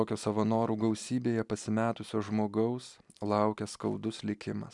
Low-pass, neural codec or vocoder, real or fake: 10.8 kHz; none; real